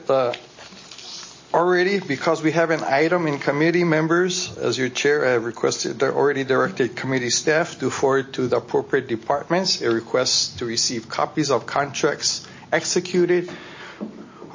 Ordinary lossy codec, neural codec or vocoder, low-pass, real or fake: MP3, 32 kbps; none; 7.2 kHz; real